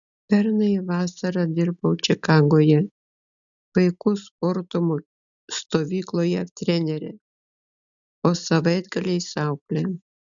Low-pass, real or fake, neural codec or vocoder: 7.2 kHz; real; none